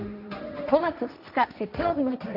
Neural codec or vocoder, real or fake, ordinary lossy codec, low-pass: codec, 16 kHz, 1.1 kbps, Voila-Tokenizer; fake; none; 5.4 kHz